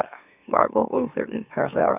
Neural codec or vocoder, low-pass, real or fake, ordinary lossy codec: autoencoder, 44.1 kHz, a latent of 192 numbers a frame, MeloTTS; 3.6 kHz; fake; none